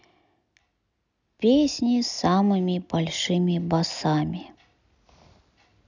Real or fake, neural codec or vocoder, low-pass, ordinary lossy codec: real; none; 7.2 kHz; none